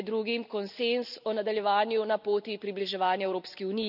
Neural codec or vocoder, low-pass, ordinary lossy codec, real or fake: none; 5.4 kHz; none; real